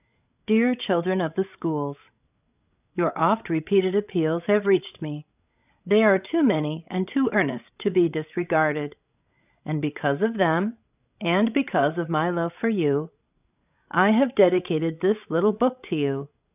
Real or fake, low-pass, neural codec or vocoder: fake; 3.6 kHz; codec, 16 kHz, 16 kbps, FreqCodec, larger model